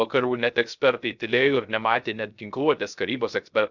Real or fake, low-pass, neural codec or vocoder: fake; 7.2 kHz; codec, 16 kHz, 0.3 kbps, FocalCodec